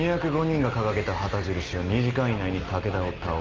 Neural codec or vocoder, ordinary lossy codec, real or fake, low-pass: none; Opus, 32 kbps; real; 7.2 kHz